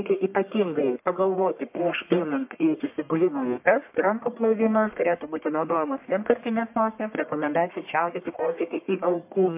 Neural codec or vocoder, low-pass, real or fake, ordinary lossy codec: codec, 44.1 kHz, 1.7 kbps, Pupu-Codec; 3.6 kHz; fake; MP3, 32 kbps